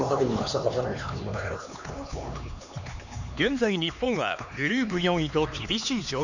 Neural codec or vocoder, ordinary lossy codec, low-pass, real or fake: codec, 16 kHz, 4 kbps, X-Codec, HuBERT features, trained on LibriSpeech; none; 7.2 kHz; fake